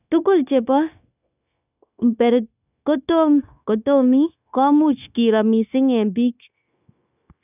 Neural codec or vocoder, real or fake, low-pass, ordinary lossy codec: codec, 16 kHz, 0.9 kbps, LongCat-Audio-Codec; fake; 3.6 kHz; none